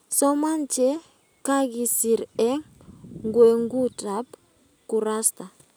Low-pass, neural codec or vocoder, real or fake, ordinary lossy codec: none; none; real; none